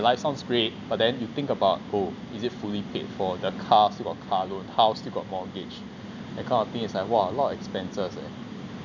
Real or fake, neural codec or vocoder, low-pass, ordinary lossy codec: real; none; 7.2 kHz; none